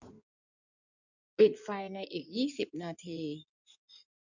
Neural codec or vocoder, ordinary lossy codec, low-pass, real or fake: codec, 16 kHz in and 24 kHz out, 2.2 kbps, FireRedTTS-2 codec; none; 7.2 kHz; fake